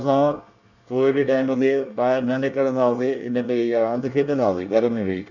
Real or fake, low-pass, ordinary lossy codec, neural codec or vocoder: fake; 7.2 kHz; none; codec, 24 kHz, 1 kbps, SNAC